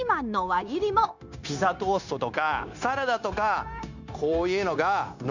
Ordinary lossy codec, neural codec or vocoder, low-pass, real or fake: none; codec, 16 kHz, 0.9 kbps, LongCat-Audio-Codec; 7.2 kHz; fake